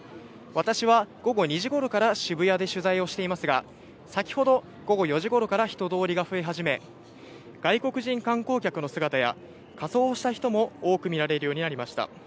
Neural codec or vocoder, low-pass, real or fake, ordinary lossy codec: none; none; real; none